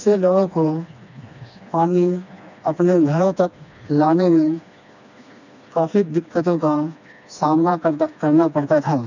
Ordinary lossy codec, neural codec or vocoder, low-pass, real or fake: none; codec, 16 kHz, 2 kbps, FreqCodec, smaller model; 7.2 kHz; fake